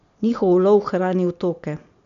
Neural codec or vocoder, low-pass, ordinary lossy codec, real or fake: none; 7.2 kHz; none; real